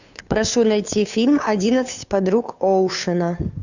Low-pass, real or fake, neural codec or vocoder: 7.2 kHz; fake; codec, 16 kHz, 2 kbps, FunCodec, trained on Chinese and English, 25 frames a second